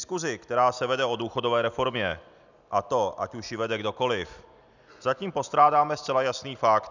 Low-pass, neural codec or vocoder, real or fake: 7.2 kHz; none; real